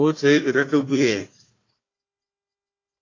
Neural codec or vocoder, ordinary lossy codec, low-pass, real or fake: codec, 16 kHz, 1 kbps, FunCodec, trained on Chinese and English, 50 frames a second; AAC, 32 kbps; 7.2 kHz; fake